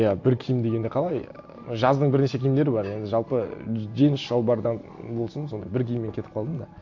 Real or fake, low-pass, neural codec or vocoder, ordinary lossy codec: real; 7.2 kHz; none; AAC, 48 kbps